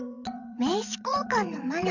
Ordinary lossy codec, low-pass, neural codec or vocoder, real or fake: none; 7.2 kHz; vocoder, 22.05 kHz, 80 mel bands, WaveNeXt; fake